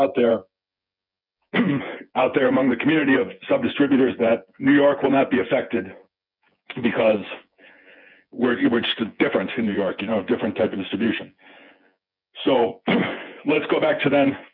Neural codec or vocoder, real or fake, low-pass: vocoder, 24 kHz, 100 mel bands, Vocos; fake; 5.4 kHz